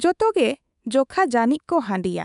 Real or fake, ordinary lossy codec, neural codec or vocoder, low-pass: fake; none; codec, 24 kHz, 3.1 kbps, DualCodec; 10.8 kHz